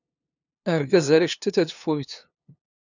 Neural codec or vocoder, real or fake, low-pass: codec, 16 kHz, 2 kbps, FunCodec, trained on LibriTTS, 25 frames a second; fake; 7.2 kHz